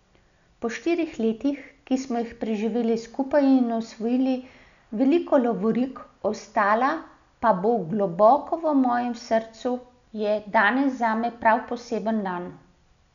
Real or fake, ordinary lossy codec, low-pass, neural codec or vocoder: real; none; 7.2 kHz; none